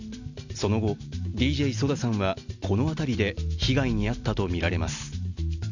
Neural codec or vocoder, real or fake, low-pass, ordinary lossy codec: none; real; 7.2 kHz; none